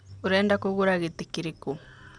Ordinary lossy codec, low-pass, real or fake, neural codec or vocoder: none; 9.9 kHz; real; none